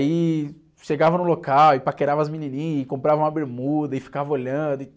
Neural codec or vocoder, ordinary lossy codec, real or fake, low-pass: none; none; real; none